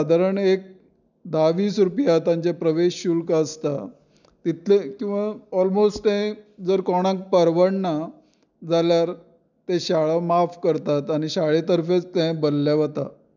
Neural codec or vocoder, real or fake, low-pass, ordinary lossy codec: none; real; 7.2 kHz; none